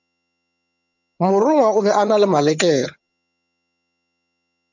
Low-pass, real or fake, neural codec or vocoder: 7.2 kHz; fake; vocoder, 22.05 kHz, 80 mel bands, HiFi-GAN